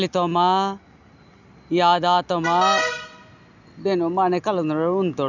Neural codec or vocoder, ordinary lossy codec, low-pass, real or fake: none; none; 7.2 kHz; real